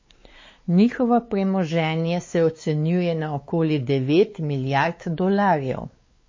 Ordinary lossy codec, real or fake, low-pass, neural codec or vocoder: MP3, 32 kbps; fake; 7.2 kHz; codec, 16 kHz, 4 kbps, X-Codec, WavLM features, trained on Multilingual LibriSpeech